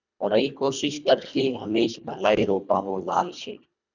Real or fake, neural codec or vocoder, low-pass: fake; codec, 24 kHz, 1.5 kbps, HILCodec; 7.2 kHz